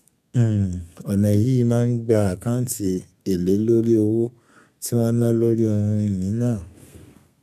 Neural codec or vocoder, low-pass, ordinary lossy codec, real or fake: codec, 32 kHz, 1.9 kbps, SNAC; 14.4 kHz; none; fake